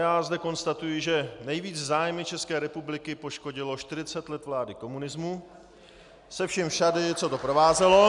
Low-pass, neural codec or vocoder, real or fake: 10.8 kHz; none; real